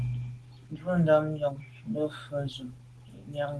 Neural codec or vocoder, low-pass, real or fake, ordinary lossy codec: none; 10.8 kHz; real; Opus, 16 kbps